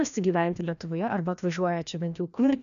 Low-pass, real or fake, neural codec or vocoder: 7.2 kHz; fake; codec, 16 kHz, 1 kbps, FreqCodec, larger model